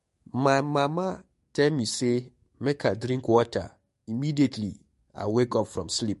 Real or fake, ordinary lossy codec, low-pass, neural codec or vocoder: fake; MP3, 48 kbps; 14.4 kHz; codec, 44.1 kHz, 7.8 kbps, DAC